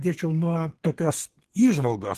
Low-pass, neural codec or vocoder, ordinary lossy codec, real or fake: 14.4 kHz; codec, 32 kHz, 1.9 kbps, SNAC; Opus, 16 kbps; fake